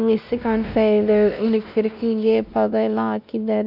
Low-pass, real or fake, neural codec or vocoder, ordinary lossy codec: 5.4 kHz; fake; codec, 16 kHz, 1 kbps, X-Codec, WavLM features, trained on Multilingual LibriSpeech; none